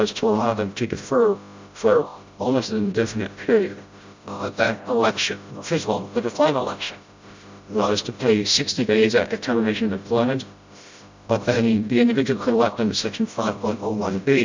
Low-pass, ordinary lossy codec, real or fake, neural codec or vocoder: 7.2 kHz; MP3, 64 kbps; fake; codec, 16 kHz, 0.5 kbps, FreqCodec, smaller model